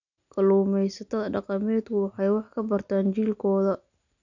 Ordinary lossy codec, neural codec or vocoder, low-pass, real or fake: none; none; 7.2 kHz; real